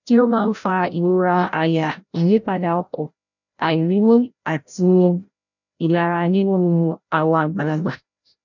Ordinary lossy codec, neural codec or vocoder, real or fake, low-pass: none; codec, 16 kHz, 0.5 kbps, FreqCodec, larger model; fake; 7.2 kHz